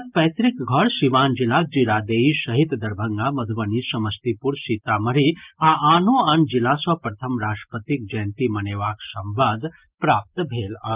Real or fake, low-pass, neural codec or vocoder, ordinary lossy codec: real; 3.6 kHz; none; Opus, 24 kbps